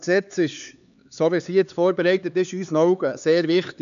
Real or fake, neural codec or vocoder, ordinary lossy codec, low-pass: fake; codec, 16 kHz, 4 kbps, X-Codec, HuBERT features, trained on LibriSpeech; none; 7.2 kHz